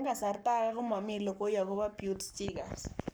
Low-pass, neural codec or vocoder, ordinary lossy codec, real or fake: none; codec, 44.1 kHz, 7.8 kbps, Pupu-Codec; none; fake